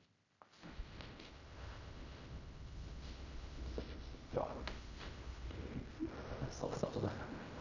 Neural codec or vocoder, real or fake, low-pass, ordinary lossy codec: codec, 16 kHz in and 24 kHz out, 0.4 kbps, LongCat-Audio-Codec, fine tuned four codebook decoder; fake; 7.2 kHz; none